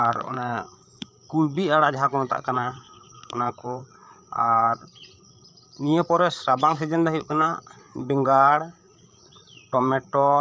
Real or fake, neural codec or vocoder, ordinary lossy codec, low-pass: fake; codec, 16 kHz, 8 kbps, FreqCodec, larger model; none; none